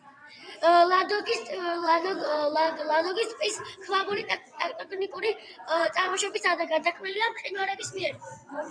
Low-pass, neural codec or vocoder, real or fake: 9.9 kHz; vocoder, 22.05 kHz, 80 mel bands, WaveNeXt; fake